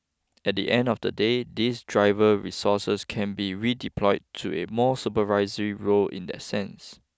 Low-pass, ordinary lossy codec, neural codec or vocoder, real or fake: none; none; none; real